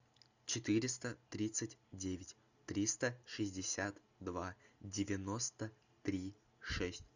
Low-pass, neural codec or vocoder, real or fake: 7.2 kHz; none; real